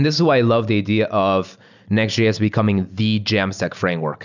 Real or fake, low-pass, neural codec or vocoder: real; 7.2 kHz; none